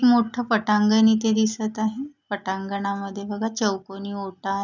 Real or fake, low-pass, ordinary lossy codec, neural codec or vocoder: real; 7.2 kHz; none; none